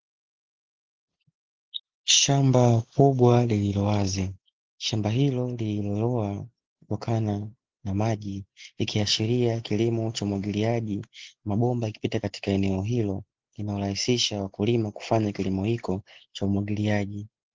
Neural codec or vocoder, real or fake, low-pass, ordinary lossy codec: none; real; 7.2 kHz; Opus, 16 kbps